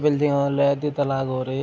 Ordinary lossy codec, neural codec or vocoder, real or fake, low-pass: none; none; real; none